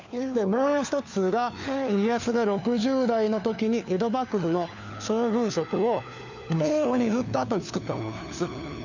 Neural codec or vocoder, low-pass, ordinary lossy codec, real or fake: codec, 16 kHz, 4 kbps, FunCodec, trained on LibriTTS, 50 frames a second; 7.2 kHz; none; fake